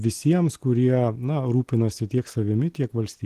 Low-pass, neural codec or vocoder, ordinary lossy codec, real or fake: 14.4 kHz; none; Opus, 16 kbps; real